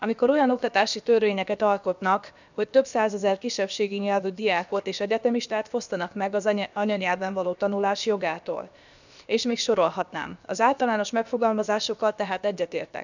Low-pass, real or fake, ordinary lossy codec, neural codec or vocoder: 7.2 kHz; fake; none; codec, 16 kHz, about 1 kbps, DyCAST, with the encoder's durations